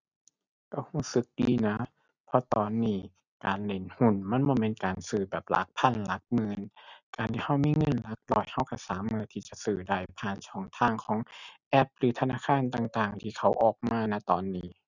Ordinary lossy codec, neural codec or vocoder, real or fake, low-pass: none; none; real; 7.2 kHz